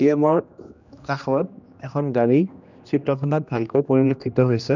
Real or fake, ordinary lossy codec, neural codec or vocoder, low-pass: fake; none; codec, 16 kHz, 1 kbps, X-Codec, HuBERT features, trained on general audio; 7.2 kHz